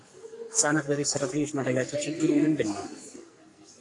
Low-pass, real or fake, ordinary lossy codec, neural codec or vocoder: 10.8 kHz; fake; AAC, 48 kbps; codec, 44.1 kHz, 2.6 kbps, SNAC